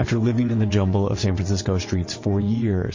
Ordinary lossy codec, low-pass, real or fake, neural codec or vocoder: MP3, 32 kbps; 7.2 kHz; fake; vocoder, 22.05 kHz, 80 mel bands, WaveNeXt